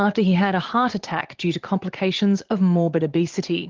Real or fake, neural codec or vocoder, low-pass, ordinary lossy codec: fake; vocoder, 44.1 kHz, 80 mel bands, Vocos; 7.2 kHz; Opus, 16 kbps